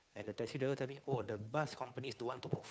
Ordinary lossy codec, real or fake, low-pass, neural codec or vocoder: none; fake; none; codec, 16 kHz, 2 kbps, FunCodec, trained on Chinese and English, 25 frames a second